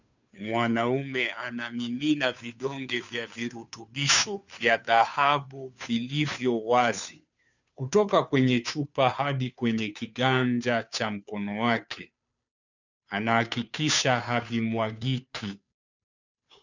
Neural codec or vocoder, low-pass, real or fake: codec, 16 kHz, 2 kbps, FunCodec, trained on Chinese and English, 25 frames a second; 7.2 kHz; fake